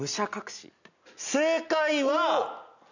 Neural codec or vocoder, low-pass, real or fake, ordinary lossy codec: none; 7.2 kHz; real; none